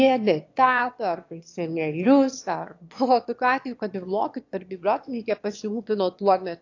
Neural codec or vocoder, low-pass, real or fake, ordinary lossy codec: autoencoder, 22.05 kHz, a latent of 192 numbers a frame, VITS, trained on one speaker; 7.2 kHz; fake; AAC, 48 kbps